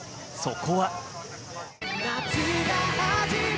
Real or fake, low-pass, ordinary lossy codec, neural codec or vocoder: real; none; none; none